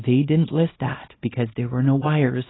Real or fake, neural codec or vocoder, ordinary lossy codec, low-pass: fake; codec, 24 kHz, 0.9 kbps, WavTokenizer, small release; AAC, 16 kbps; 7.2 kHz